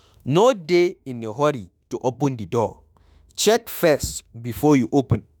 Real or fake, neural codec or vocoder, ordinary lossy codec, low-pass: fake; autoencoder, 48 kHz, 32 numbers a frame, DAC-VAE, trained on Japanese speech; none; none